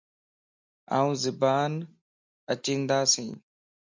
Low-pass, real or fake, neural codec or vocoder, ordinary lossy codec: 7.2 kHz; real; none; MP3, 64 kbps